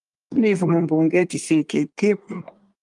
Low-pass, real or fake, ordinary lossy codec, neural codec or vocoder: 10.8 kHz; fake; Opus, 32 kbps; codec, 24 kHz, 1 kbps, SNAC